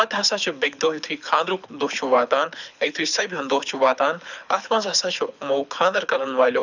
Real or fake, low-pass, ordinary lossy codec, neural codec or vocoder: fake; 7.2 kHz; none; codec, 24 kHz, 6 kbps, HILCodec